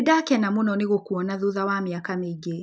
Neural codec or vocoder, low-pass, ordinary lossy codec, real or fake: none; none; none; real